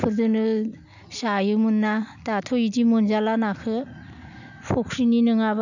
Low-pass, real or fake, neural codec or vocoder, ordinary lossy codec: 7.2 kHz; real; none; none